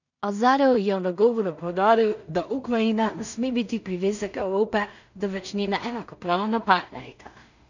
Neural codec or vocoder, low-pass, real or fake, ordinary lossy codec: codec, 16 kHz in and 24 kHz out, 0.4 kbps, LongCat-Audio-Codec, two codebook decoder; 7.2 kHz; fake; none